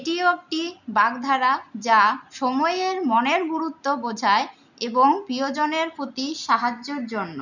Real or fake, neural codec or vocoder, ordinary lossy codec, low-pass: real; none; none; 7.2 kHz